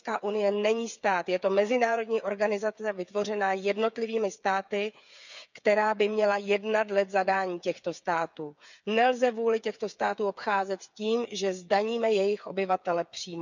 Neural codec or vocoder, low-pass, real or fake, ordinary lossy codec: codec, 16 kHz, 8 kbps, FreqCodec, smaller model; 7.2 kHz; fake; none